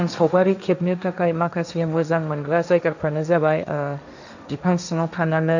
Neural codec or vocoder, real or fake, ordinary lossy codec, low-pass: codec, 16 kHz, 1.1 kbps, Voila-Tokenizer; fake; none; 7.2 kHz